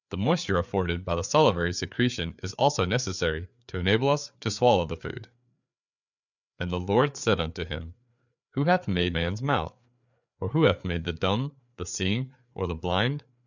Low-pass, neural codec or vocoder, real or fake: 7.2 kHz; codec, 16 kHz, 4 kbps, FreqCodec, larger model; fake